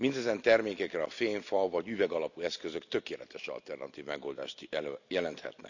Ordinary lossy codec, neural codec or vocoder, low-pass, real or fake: none; none; 7.2 kHz; real